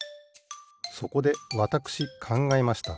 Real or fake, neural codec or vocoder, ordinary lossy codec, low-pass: real; none; none; none